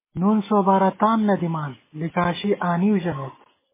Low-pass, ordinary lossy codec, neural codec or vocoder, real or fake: 3.6 kHz; MP3, 16 kbps; none; real